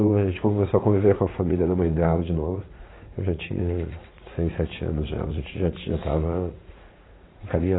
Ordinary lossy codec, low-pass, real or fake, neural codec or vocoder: AAC, 16 kbps; 7.2 kHz; fake; vocoder, 44.1 kHz, 80 mel bands, Vocos